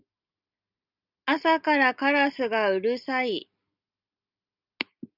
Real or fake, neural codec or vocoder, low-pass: real; none; 5.4 kHz